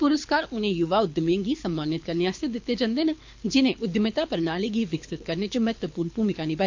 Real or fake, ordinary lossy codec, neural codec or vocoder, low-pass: fake; MP3, 48 kbps; codec, 24 kHz, 6 kbps, HILCodec; 7.2 kHz